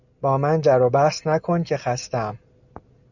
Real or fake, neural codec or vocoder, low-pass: real; none; 7.2 kHz